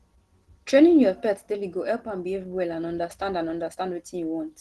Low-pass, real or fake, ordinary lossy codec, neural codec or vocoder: 10.8 kHz; real; Opus, 16 kbps; none